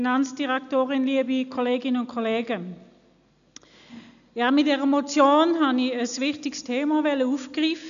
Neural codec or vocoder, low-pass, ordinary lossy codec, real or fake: none; 7.2 kHz; none; real